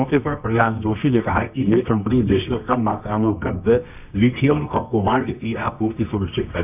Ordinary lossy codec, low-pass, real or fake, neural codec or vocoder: none; 3.6 kHz; fake; codec, 24 kHz, 0.9 kbps, WavTokenizer, medium music audio release